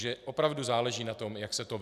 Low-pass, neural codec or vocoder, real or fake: 14.4 kHz; none; real